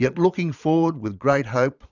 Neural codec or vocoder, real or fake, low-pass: vocoder, 22.05 kHz, 80 mel bands, Vocos; fake; 7.2 kHz